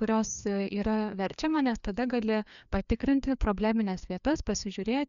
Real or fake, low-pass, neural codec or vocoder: fake; 7.2 kHz; codec, 16 kHz, 2 kbps, FreqCodec, larger model